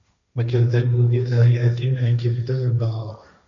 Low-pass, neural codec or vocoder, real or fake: 7.2 kHz; codec, 16 kHz, 1.1 kbps, Voila-Tokenizer; fake